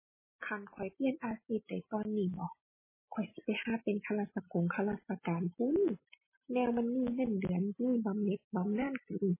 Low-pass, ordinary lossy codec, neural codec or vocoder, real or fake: 3.6 kHz; MP3, 16 kbps; none; real